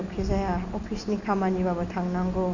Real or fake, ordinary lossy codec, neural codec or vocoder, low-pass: real; none; none; 7.2 kHz